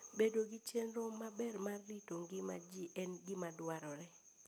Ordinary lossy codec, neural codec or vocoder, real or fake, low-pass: none; none; real; none